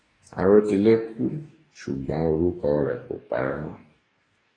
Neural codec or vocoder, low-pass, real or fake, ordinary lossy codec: codec, 44.1 kHz, 2.6 kbps, DAC; 9.9 kHz; fake; AAC, 32 kbps